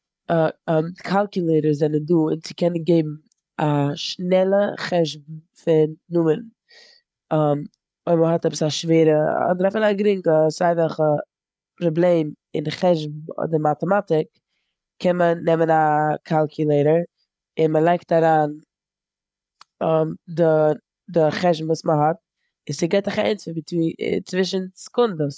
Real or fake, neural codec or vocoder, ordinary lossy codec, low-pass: fake; codec, 16 kHz, 8 kbps, FreqCodec, larger model; none; none